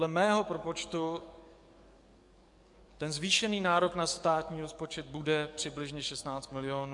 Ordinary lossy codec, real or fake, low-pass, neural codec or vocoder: MP3, 64 kbps; fake; 10.8 kHz; codec, 44.1 kHz, 7.8 kbps, DAC